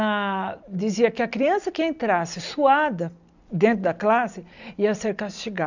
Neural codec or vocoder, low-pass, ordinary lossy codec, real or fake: none; 7.2 kHz; none; real